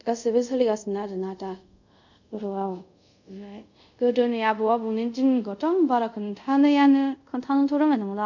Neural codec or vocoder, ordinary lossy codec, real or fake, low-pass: codec, 24 kHz, 0.5 kbps, DualCodec; none; fake; 7.2 kHz